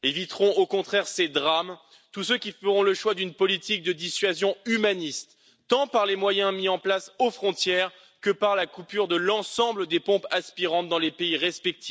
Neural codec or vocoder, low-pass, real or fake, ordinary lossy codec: none; none; real; none